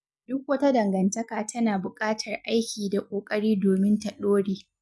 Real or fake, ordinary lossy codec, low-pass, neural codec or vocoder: real; none; none; none